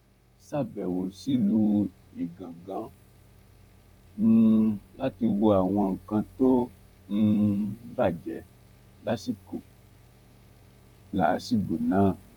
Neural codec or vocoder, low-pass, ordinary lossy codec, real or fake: vocoder, 44.1 kHz, 128 mel bands, Pupu-Vocoder; 19.8 kHz; none; fake